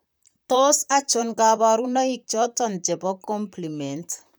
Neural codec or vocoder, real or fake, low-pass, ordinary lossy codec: vocoder, 44.1 kHz, 128 mel bands, Pupu-Vocoder; fake; none; none